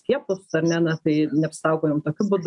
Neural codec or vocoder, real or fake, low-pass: none; real; 10.8 kHz